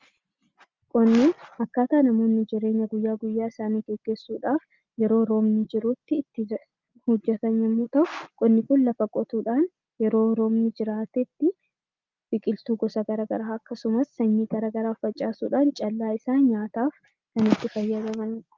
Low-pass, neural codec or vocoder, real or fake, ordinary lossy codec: 7.2 kHz; none; real; Opus, 32 kbps